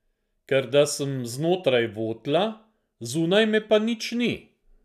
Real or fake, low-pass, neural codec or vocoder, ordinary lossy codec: real; 14.4 kHz; none; none